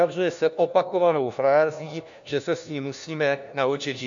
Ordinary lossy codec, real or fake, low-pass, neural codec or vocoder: MP3, 64 kbps; fake; 7.2 kHz; codec, 16 kHz, 1 kbps, FunCodec, trained on LibriTTS, 50 frames a second